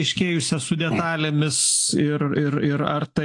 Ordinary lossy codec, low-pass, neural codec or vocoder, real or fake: AAC, 48 kbps; 10.8 kHz; none; real